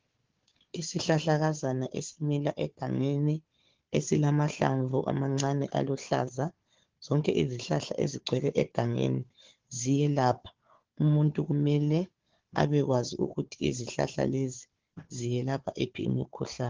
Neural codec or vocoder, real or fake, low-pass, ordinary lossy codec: codec, 16 kHz, 4 kbps, FunCodec, trained on Chinese and English, 50 frames a second; fake; 7.2 kHz; Opus, 16 kbps